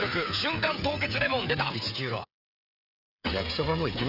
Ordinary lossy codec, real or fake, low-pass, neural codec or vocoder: none; fake; 5.4 kHz; codec, 16 kHz, 16 kbps, FreqCodec, smaller model